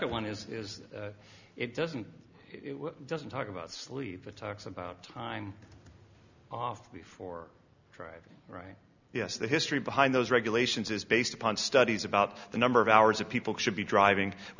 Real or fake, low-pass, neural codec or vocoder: real; 7.2 kHz; none